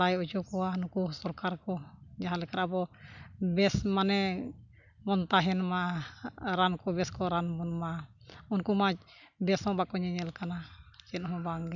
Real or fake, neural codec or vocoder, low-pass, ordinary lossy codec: real; none; 7.2 kHz; none